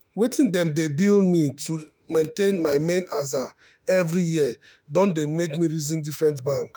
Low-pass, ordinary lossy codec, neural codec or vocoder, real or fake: none; none; autoencoder, 48 kHz, 32 numbers a frame, DAC-VAE, trained on Japanese speech; fake